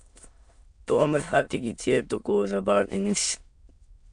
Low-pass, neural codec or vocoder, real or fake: 9.9 kHz; autoencoder, 22.05 kHz, a latent of 192 numbers a frame, VITS, trained on many speakers; fake